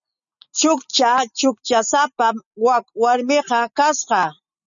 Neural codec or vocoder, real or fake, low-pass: none; real; 7.2 kHz